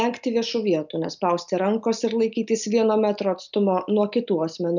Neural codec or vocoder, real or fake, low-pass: none; real; 7.2 kHz